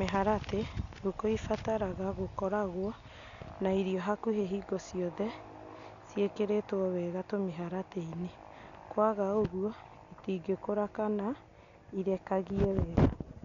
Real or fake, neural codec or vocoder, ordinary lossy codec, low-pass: real; none; none; 7.2 kHz